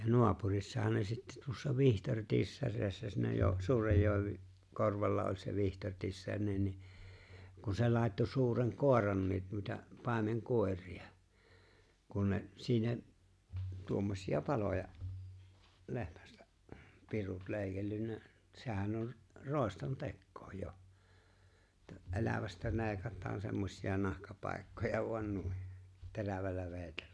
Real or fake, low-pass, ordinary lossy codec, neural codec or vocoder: real; none; none; none